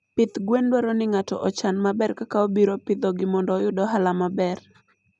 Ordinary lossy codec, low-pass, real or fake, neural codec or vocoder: none; 10.8 kHz; real; none